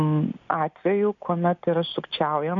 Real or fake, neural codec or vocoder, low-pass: real; none; 7.2 kHz